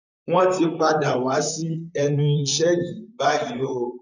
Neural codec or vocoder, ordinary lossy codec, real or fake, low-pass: vocoder, 44.1 kHz, 128 mel bands, Pupu-Vocoder; none; fake; 7.2 kHz